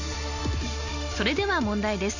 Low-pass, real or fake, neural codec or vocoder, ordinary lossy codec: 7.2 kHz; real; none; none